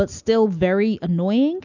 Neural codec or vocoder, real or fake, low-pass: none; real; 7.2 kHz